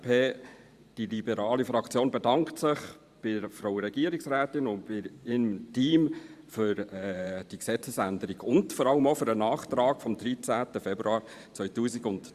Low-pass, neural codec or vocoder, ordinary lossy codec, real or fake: 14.4 kHz; vocoder, 44.1 kHz, 128 mel bands every 512 samples, BigVGAN v2; Opus, 64 kbps; fake